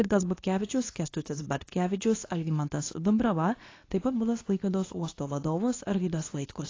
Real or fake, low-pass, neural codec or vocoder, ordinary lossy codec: fake; 7.2 kHz; codec, 24 kHz, 0.9 kbps, WavTokenizer, medium speech release version 2; AAC, 32 kbps